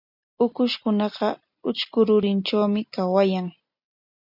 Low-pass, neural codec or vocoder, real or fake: 5.4 kHz; none; real